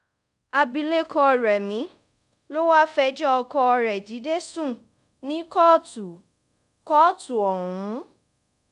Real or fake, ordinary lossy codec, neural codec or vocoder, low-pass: fake; none; codec, 24 kHz, 0.5 kbps, DualCodec; 10.8 kHz